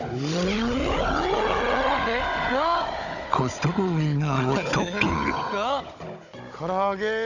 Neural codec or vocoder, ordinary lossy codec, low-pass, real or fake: codec, 16 kHz, 16 kbps, FunCodec, trained on Chinese and English, 50 frames a second; none; 7.2 kHz; fake